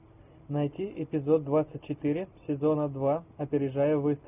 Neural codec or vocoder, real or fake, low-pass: none; real; 3.6 kHz